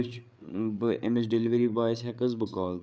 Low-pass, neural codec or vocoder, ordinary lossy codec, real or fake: none; codec, 16 kHz, 8 kbps, FreqCodec, larger model; none; fake